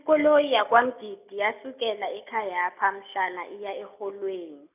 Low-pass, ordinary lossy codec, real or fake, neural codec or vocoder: 3.6 kHz; none; real; none